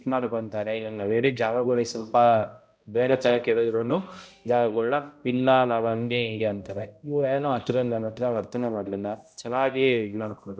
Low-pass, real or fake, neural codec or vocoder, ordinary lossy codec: none; fake; codec, 16 kHz, 0.5 kbps, X-Codec, HuBERT features, trained on balanced general audio; none